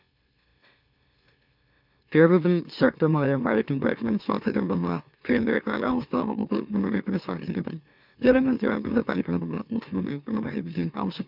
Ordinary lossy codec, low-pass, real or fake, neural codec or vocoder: none; 5.4 kHz; fake; autoencoder, 44.1 kHz, a latent of 192 numbers a frame, MeloTTS